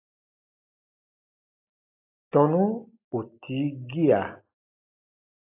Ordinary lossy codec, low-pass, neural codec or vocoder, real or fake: AAC, 32 kbps; 3.6 kHz; none; real